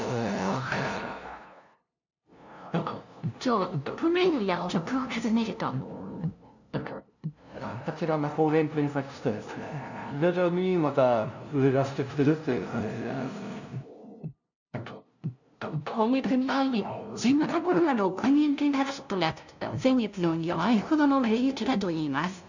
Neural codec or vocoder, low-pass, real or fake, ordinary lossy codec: codec, 16 kHz, 0.5 kbps, FunCodec, trained on LibriTTS, 25 frames a second; 7.2 kHz; fake; none